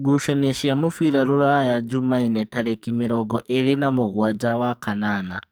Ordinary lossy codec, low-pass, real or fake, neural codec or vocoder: none; none; fake; codec, 44.1 kHz, 2.6 kbps, SNAC